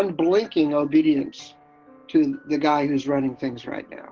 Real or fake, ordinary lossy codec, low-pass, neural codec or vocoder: real; Opus, 16 kbps; 7.2 kHz; none